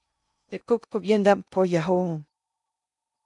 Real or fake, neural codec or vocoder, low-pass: fake; codec, 16 kHz in and 24 kHz out, 0.6 kbps, FocalCodec, streaming, 2048 codes; 10.8 kHz